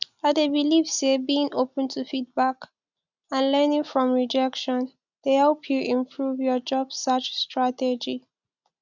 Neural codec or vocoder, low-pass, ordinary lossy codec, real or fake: none; 7.2 kHz; none; real